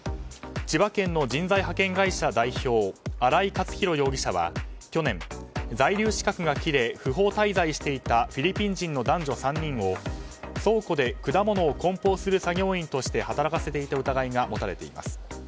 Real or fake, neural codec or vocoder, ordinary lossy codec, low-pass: real; none; none; none